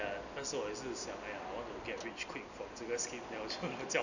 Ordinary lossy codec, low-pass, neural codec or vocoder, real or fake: none; 7.2 kHz; none; real